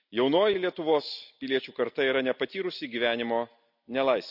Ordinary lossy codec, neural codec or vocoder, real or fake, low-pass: none; none; real; 5.4 kHz